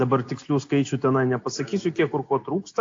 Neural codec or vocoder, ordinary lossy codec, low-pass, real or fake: none; AAC, 48 kbps; 7.2 kHz; real